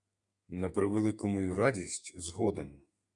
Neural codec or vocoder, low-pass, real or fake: codec, 32 kHz, 1.9 kbps, SNAC; 10.8 kHz; fake